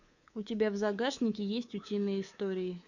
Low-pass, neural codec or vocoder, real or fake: 7.2 kHz; codec, 24 kHz, 3.1 kbps, DualCodec; fake